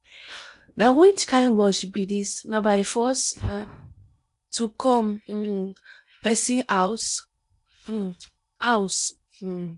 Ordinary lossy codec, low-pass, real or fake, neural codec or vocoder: none; 10.8 kHz; fake; codec, 16 kHz in and 24 kHz out, 0.8 kbps, FocalCodec, streaming, 65536 codes